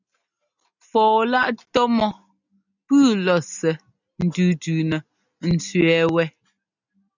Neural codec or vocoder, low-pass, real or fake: none; 7.2 kHz; real